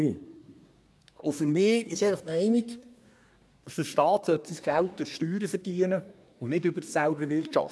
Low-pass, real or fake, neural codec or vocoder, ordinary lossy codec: none; fake; codec, 24 kHz, 1 kbps, SNAC; none